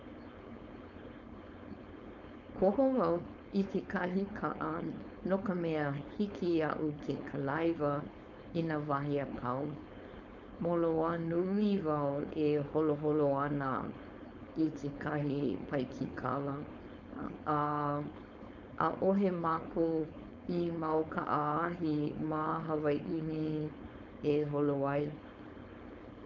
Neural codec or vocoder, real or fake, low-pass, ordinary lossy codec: codec, 16 kHz, 4.8 kbps, FACodec; fake; 7.2 kHz; none